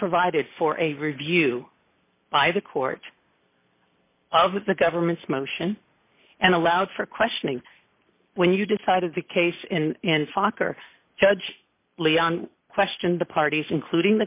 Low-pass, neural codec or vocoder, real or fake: 3.6 kHz; none; real